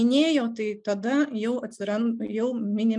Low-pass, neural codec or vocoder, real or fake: 10.8 kHz; none; real